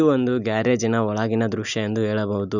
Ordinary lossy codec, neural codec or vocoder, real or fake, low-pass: none; none; real; 7.2 kHz